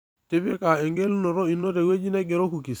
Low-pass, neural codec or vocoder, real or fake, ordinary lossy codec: none; vocoder, 44.1 kHz, 128 mel bands every 256 samples, BigVGAN v2; fake; none